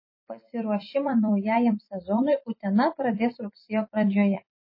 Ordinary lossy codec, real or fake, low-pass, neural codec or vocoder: MP3, 24 kbps; fake; 5.4 kHz; vocoder, 44.1 kHz, 128 mel bands every 256 samples, BigVGAN v2